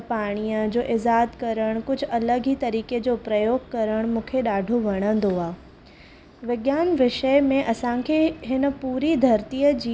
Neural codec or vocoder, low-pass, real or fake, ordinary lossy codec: none; none; real; none